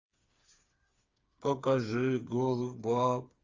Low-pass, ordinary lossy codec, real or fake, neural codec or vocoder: 7.2 kHz; Opus, 32 kbps; fake; codec, 16 kHz, 4 kbps, FreqCodec, smaller model